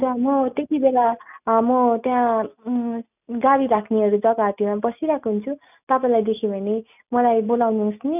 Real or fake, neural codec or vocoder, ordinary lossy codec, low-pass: real; none; none; 3.6 kHz